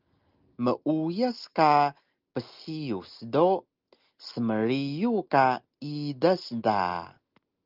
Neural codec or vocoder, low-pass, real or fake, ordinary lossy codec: none; 5.4 kHz; real; Opus, 32 kbps